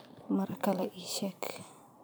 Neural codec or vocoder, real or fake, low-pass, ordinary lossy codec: vocoder, 44.1 kHz, 128 mel bands every 512 samples, BigVGAN v2; fake; none; none